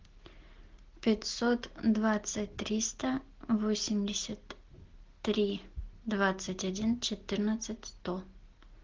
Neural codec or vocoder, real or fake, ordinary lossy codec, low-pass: none; real; Opus, 16 kbps; 7.2 kHz